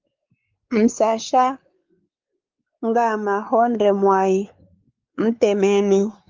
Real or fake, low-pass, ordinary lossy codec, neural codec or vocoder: fake; 7.2 kHz; Opus, 32 kbps; codec, 16 kHz, 4 kbps, X-Codec, WavLM features, trained on Multilingual LibriSpeech